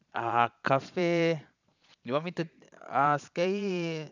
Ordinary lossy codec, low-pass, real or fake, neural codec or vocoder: none; 7.2 kHz; fake; vocoder, 22.05 kHz, 80 mel bands, Vocos